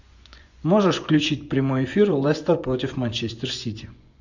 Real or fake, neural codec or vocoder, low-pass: fake; vocoder, 24 kHz, 100 mel bands, Vocos; 7.2 kHz